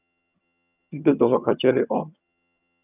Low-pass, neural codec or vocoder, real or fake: 3.6 kHz; vocoder, 22.05 kHz, 80 mel bands, HiFi-GAN; fake